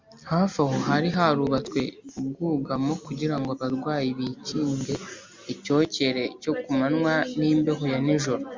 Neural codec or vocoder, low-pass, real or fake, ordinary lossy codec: none; 7.2 kHz; real; MP3, 64 kbps